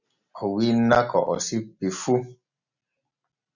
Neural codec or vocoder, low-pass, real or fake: none; 7.2 kHz; real